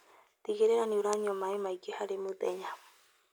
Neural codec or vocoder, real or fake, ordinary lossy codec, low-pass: none; real; none; none